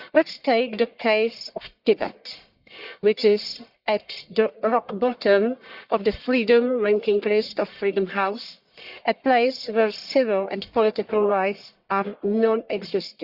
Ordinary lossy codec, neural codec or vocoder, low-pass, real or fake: Opus, 64 kbps; codec, 44.1 kHz, 1.7 kbps, Pupu-Codec; 5.4 kHz; fake